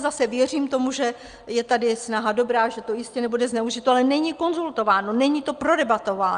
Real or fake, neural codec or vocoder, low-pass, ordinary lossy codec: real; none; 9.9 kHz; Opus, 32 kbps